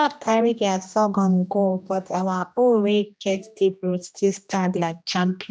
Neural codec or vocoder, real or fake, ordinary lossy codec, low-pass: codec, 16 kHz, 1 kbps, X-Codec, HuBERT features, trained on general audio; fake; none; none